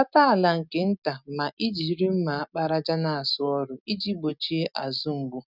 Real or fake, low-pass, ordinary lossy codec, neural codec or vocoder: real; 5.4 kHz; none; none